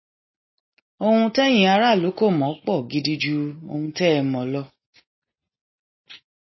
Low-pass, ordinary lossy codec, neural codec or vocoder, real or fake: 7.2 kHz; MP3, 24 kbps; none; real